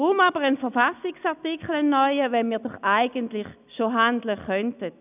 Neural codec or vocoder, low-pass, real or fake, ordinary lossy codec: none; 3.6 kHz; real; none